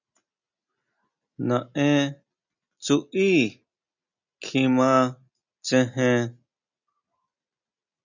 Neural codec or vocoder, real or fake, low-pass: none; real; 7.2 kHz